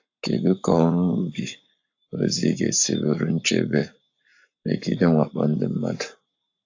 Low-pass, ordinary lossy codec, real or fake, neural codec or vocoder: 7.2 kHz; AAC, 48 kbps; fake; codec, 44.1 kHz, 7.8 kbps, Pupu-Codec